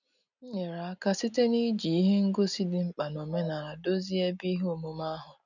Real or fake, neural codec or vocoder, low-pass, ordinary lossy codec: real; none; 7.2 kHz; none